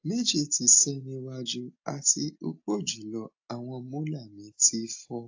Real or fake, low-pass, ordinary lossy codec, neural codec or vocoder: real; 7.2 kHz; AAC, 48 kbps; none